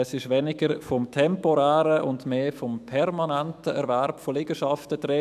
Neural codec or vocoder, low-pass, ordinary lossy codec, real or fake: autoencoder, 48 kHz, 128 numbers a frame, DAC-VAE, trained on Japanese speech; 14.4 kHz; none; fake